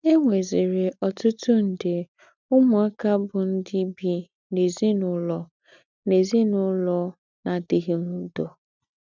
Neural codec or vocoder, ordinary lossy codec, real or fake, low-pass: none; none; real; 7.2 kHz